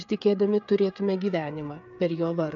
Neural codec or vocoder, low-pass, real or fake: codec, 16 kHz, 8 kbps, FreqCodec, smaller model; 7.2 kHz; fake